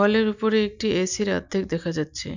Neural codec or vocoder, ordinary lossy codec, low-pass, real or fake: none; none; 7.2 kHz; real